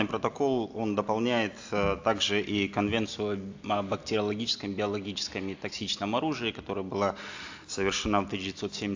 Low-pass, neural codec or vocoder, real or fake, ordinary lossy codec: 7.2 kHz; none; real; AAC, 48 kbps